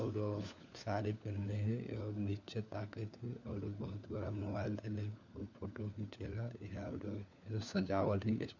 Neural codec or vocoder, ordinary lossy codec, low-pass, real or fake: codec, 16 kHz, 4 kbps, FunCodec, trained on LibriTTS, 50 frames a second; none; 7.2 kHz; fake